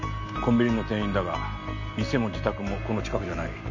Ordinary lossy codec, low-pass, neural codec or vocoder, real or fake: none; 7.2 kHz; none; real